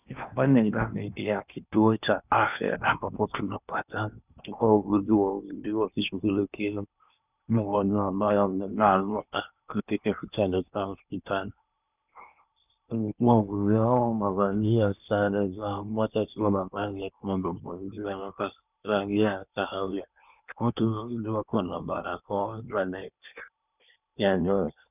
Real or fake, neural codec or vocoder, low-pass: fake; codec, 16 kHz in and 24 kHz out, 0.8 kbps, FocalCodec, streaming, 65536 codes; 3.6 kHz